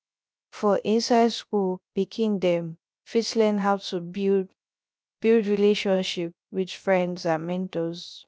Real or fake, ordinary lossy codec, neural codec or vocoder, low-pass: fake; none; codec, 16 kHz, 0.3 kbps, FocalCodec; none